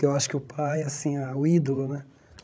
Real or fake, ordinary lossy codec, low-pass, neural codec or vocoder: fake; none; none; codec, 16 kHz, 16 kbps, FreqCodec, larger model